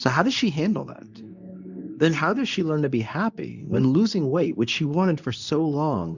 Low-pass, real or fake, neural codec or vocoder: 7.2 kHz; fake; codec, 24 kHz, 0.9 kbps, WavTokenizer, medium speech release version 2